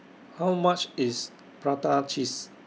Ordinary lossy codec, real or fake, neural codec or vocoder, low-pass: none; real; none; none